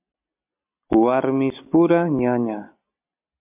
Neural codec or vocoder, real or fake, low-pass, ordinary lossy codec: none; real; 3.6 kHz; MP3, 32 kbps